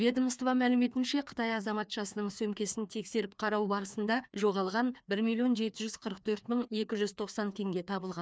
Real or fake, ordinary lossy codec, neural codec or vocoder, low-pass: fake; none; codec, 16 kHz, 2 kbps, FreqCodec, larger model; none